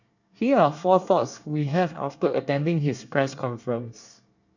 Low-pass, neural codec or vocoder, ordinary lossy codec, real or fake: 7.2 kHz; codec, 24 kHz, 1 kbps, SNAC; none; fake